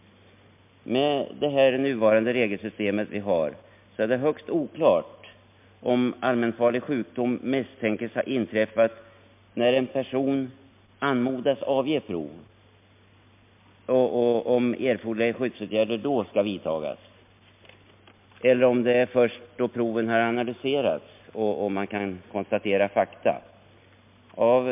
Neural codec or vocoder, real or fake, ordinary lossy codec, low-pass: none; real; MP3, 32 kbps; 3.6 kHz